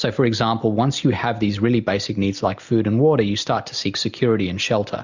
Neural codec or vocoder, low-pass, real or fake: none; 7.2 kHz; real